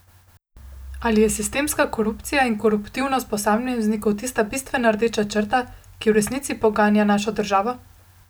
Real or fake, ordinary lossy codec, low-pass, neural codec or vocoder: real; none; none; none